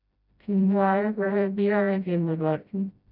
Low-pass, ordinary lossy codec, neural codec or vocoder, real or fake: 5.4 kHz; none; codec, 16 kHz, 0.5 kbps, FreqCodec, smaller model; fake